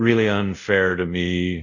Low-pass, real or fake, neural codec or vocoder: 7.2 kHz; fake; codec, 24 kHz, 0.5 kbps, DualCodec